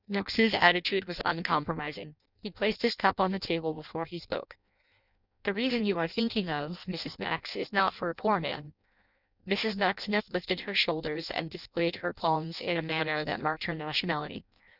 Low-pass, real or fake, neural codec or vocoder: 5.4 kHz; fake; codec, 16 kHz in and 24 kHz out, 0.6 kbps, FireRedTTS-2 codec